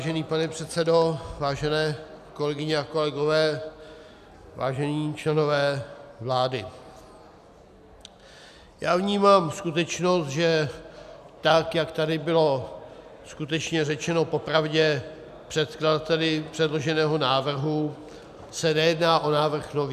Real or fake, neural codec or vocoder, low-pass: real; none; 14.4 kHz